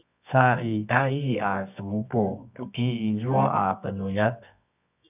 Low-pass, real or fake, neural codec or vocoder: 3.6 kHz; fake; codec, 24 kHz, 0.9 kbps, WavTokenizer, medium music audio release